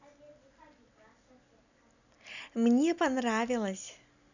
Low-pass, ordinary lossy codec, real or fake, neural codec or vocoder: 7.2 kHz; none; real; none